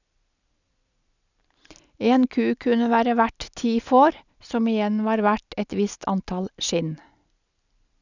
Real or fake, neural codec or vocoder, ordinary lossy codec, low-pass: real; none; none; 7.2 kHz